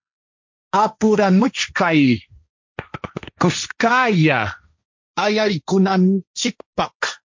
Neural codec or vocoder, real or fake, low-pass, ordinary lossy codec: codec, 16 kHz, 1.1 kbps, Voila-Tokenizer; fake; 7.2 kHz; MP3, 48 kbps